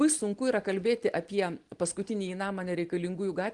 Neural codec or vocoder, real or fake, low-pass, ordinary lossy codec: none; real; 10.8 kHz; Opus, 24 kbps